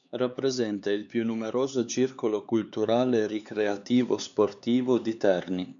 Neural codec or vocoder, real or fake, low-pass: codec, 16 kHz, 4 kbps, X-Codec, HuBERT features, trained on LibriSpeech; fake; 7.2 kHz